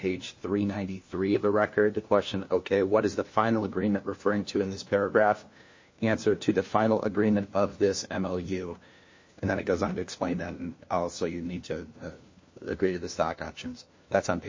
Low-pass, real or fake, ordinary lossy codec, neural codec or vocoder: 7.2 kHz; fake; MP3, 32 kbps; codec, 16 kHz, 1 kbps, FunCodec, trained on LibriTTS, 50 frames a second